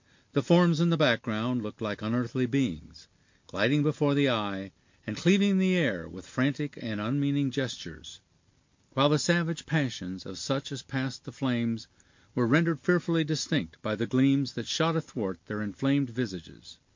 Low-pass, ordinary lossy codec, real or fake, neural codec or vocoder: 7.2 kHz; MP3, 48 kbps; real; none